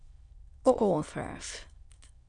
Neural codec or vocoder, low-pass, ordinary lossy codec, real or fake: autoencoder, 22.05 kHz, a latent of 192 numbers a frame, VITS, trained on many speakers; 9.9 kHz; MP3, 96 kbps; fake